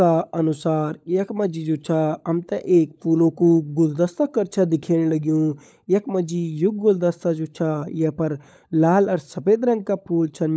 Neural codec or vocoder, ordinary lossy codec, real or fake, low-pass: codec, 16 kHz, 16 kbps, FunCodec, trained on LibriTTS, 50 frames a second; none; fake; none